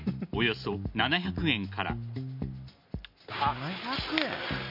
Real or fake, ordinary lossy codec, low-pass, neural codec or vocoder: real; none; 5.4 kHz; none